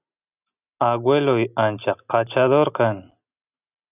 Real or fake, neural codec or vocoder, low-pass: real; none; 3.6 kHz